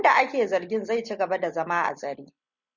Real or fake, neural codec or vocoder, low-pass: real; none; 7.2 kHz